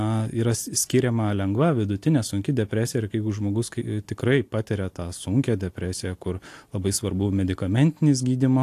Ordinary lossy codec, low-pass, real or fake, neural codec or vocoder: AAC, 64 kbps; 14.4 kHz; real; none